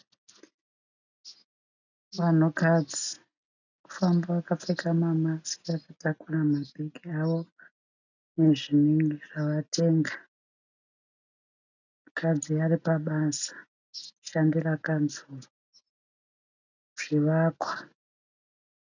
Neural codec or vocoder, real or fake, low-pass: none; real; 7.2 kHz